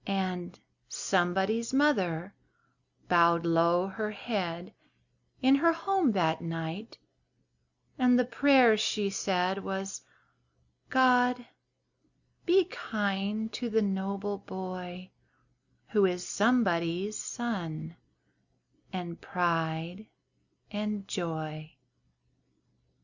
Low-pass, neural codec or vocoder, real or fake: 7.2 kHz; none; real